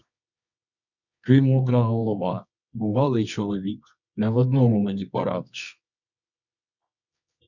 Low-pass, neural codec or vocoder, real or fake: 7.2 kHz; codec, 24 kHz, 0.9 kbps, WavTokenizer, medium music audio release; fake